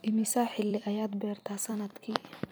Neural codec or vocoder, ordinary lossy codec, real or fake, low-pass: vocoder, 44.1 kHz, 128 mel bands every 256 samples, BigVGAN v2; none; fake; none